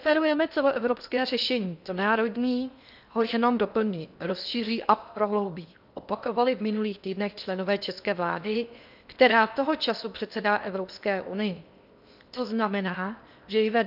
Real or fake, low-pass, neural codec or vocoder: fake; 5.4 kHz; codec, 16 kHz in and 24 kHz out, 0.8 kbps, FocalCodec, streaming, 65536 codes